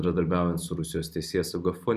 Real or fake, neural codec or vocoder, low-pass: real; none; 14.4 kHz